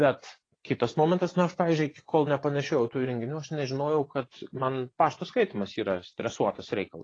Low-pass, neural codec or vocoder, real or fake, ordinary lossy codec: 9.9 kHz; vocoder, 24 kHz, 100 mel bands, Vocos; fake; AAC, 32 kbps